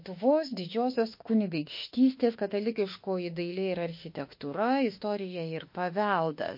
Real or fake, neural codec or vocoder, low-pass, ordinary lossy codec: fake; autoencoder, 48 kHz, 32 numbers a frame, DAC-VAE, trained on Japanese speech; 5.4 kHz; MP3, 32 kbps